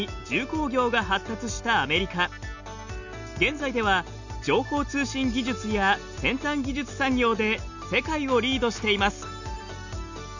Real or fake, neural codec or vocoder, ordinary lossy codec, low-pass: real; none; none; 7.2 kHz